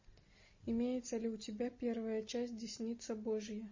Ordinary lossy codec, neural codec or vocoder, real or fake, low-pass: MP3, 32 kbps; none; real; 7.2 kHz